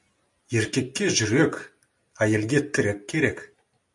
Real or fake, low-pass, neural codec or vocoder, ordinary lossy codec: real; 10.8 kHz; none; MP3, 64 kbps